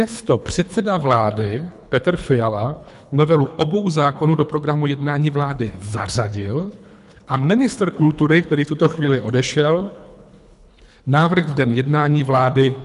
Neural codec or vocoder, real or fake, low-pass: codec, 24 kHz, 3 kbps, HILCodec; fake; 10.8 kHz